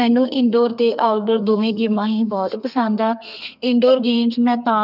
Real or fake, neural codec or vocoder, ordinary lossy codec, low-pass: fake; codec, 32 kHz, 1.9 kbps, SNAC; none; 5.4 kHz